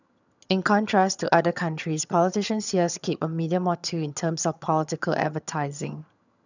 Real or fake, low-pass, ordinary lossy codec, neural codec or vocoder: fake; 7.2 kHz; none; vocoder, 22.05 kHz, 80 mel bands, HiFi-GAN